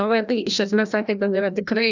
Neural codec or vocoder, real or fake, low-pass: codec, 16 kHz, 1 kbps, FreqCodec, larger model; fake; 7.2 kHz